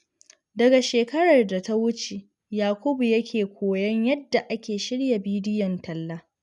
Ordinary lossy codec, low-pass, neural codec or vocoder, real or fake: none; 10.8 kHz; none; real